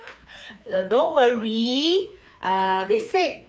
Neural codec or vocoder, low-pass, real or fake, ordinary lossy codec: codec, 16 kHz, 2 kbps, FreqCodec, larger model; none; fake; none